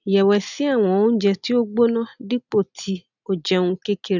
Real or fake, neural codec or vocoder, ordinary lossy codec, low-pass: real; none; none; 7.2 kHz